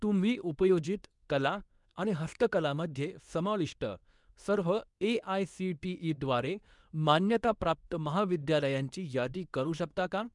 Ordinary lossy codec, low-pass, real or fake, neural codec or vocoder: none; 10.8 kHz; fake; codec, 24 kHz, 0.9 kbps, WavTokenizer, medium speech release version 2